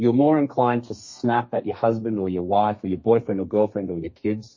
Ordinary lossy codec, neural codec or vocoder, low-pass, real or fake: MP3, 32 kbps; codec, 32 kHz, 1.9 kbps, SNAC; 7.2 kHz; fake